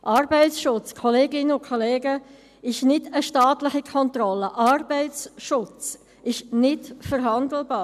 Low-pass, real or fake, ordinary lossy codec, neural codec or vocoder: 14.4 kHz; real; none; none